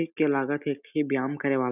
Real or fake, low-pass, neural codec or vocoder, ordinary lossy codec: real; 3.6 kHz; none; none